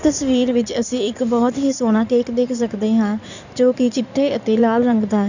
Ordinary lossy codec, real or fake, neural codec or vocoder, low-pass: none; fake; codec, 16 kHz in and 24 kHz out, 2.2 kbps, FireRedTTS-2 codec; 7.2 kHz